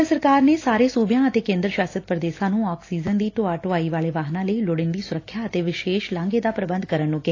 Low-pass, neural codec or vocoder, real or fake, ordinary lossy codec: 7.2 kHz; none; real; AAC, 32 kbps